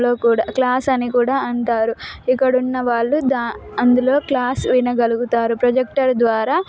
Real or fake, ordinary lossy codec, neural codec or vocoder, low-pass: real; none; none; none